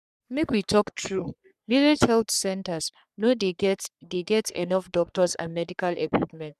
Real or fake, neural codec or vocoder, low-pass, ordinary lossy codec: fake; codec, 44.1 kHz, 3.4 kbps, Pupu-Codec; 14.4 kHz; none